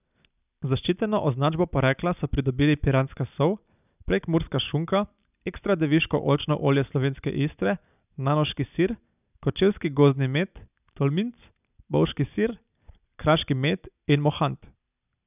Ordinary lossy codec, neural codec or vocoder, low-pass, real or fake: none; none; 3.6 kHz; real